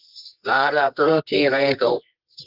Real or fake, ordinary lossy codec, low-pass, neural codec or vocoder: fake; Opus, 32 kbps; 5.4 kHz; codec, 24 kHz, 0.9 kbps, WavTokenizer, medium music audio release